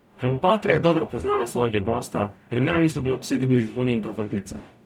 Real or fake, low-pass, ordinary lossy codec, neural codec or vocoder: fake; 19.8 kHz; none; codec, 44.1 kHz, 0.9 kbps, DAC